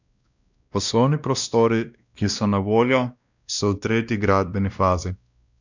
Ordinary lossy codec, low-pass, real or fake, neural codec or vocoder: none; 7.2 kHz; fake; codec, 16 kHz, 1 kbps, X-Codec, WavLM features, trained on Multilingual LibriSpeech